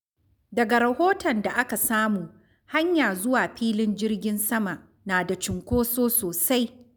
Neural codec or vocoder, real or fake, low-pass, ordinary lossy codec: none; real; none; none